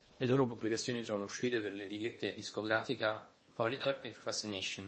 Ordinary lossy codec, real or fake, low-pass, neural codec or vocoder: MP3, 32 kbps; fake; 9.9 kHz; codec, 16 kHz in and 24 kHz out, 0.8 kbps, FocalCodec, streaming, 65536 codes